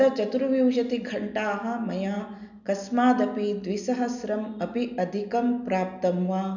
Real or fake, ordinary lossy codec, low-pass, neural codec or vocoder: real; none; 7.2 kHz; none